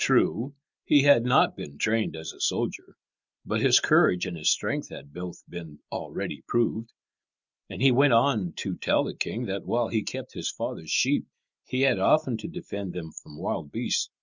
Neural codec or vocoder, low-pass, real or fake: none; 7.2 kHz; real